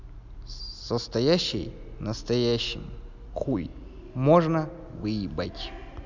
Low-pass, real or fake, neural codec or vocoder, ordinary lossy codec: 7.2 kHz; real; none; none